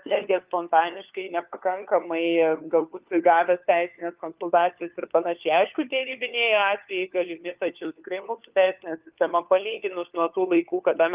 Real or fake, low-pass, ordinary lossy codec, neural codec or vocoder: fake; 3.6 kHz; Opus, 32 kbps; codec, 16 kHz, 4 kbps, FunCodec, trained on LibriTTS, 50 frames a second